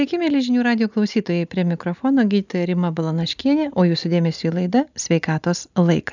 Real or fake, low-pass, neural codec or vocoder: real; 7.2 kHz; none